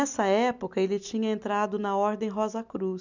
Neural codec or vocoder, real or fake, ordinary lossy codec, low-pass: none; real; none; 7.2 kHz